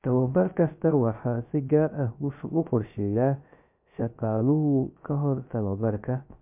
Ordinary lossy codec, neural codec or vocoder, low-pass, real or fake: MP3, 32 kbps; codec, 16 kHz, 0.3 kbps, FocalCodec; 3.6 kHz; fake